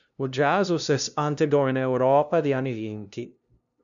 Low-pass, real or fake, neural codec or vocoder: 7.2 kHz; fake; codec, 16 kHz, 0.5 kbps, FunCodec, trained on LibriTTS, 25 frames a second